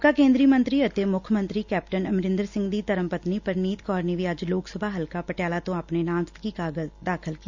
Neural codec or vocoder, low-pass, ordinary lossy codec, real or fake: none; 7.2 kHz; Opus, 64 kbps; real